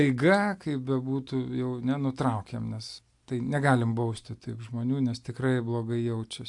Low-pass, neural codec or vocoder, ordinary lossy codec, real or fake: 10.8 kHz; none; AAC, 64 kbps; real